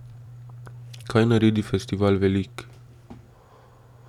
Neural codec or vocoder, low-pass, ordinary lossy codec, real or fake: vocoder, 44.1 kHz, 128 mel bands every 256 samples, BigVGAN v2; 19.8 kHz; none; fake